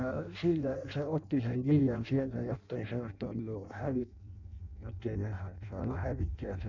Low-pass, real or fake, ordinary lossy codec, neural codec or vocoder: 7.2 kHz; fake; none; codec, 16 kHz in and 24 kHz out, 0.6 kbps, FireRedTTS-2 codec